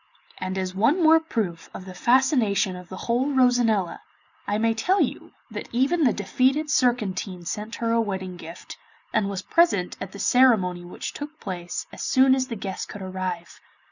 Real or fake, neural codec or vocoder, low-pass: real; none; 7.2 kHz